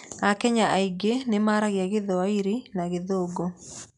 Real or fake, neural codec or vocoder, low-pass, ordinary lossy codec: real; none; 10.8 kHz; none